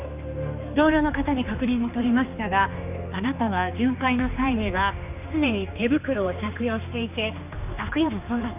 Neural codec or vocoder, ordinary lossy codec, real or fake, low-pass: codec, 16 kHz, 2 kbps, X-Codec, HuBERT features, trained on general audio; none; fake; 3.6 kHz